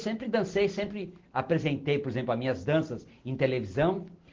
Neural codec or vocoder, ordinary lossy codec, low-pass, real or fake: none; Opus, 16 kbps; 7.2 kHz; real